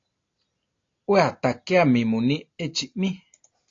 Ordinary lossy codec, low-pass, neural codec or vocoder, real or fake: AAC, 48 kbps; 7.2 kHz; none; real